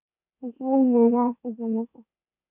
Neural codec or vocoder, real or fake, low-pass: autoencoder, 44.1 kHz, a latent of 192 numbers a frame, MeloTTS; fake; 3.6 kHz